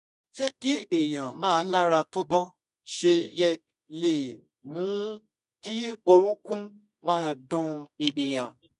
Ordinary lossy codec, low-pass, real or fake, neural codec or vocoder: none; 10.8 kHz; fake; codec, 24 kHz, 0.9 kbps, WavTokenizer, medium music audio release